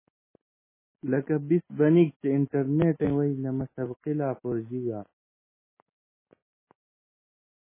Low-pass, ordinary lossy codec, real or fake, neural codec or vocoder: 3.6 kHz; MP3, 16 kbps; real; none